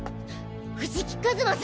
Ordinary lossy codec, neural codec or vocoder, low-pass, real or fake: none; none; none; real